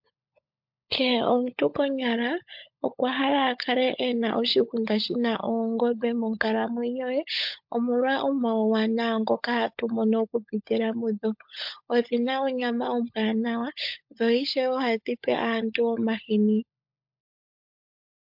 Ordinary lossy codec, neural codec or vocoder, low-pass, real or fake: MP3, 48 kbps; codec, 16 kHz, 16 kbps, FunCodec, trained on LibriTTS, 50 frames a second; 5.4 kHz; fake